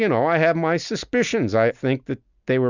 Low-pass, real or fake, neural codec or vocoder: 7.2 kHz; real; none